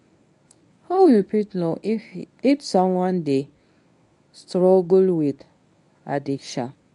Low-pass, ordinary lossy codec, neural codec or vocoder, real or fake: 10.8 kHz; none; codec, 24 kHz, 0.9 kbps, WavTokenizer, medium speech release version 1; fake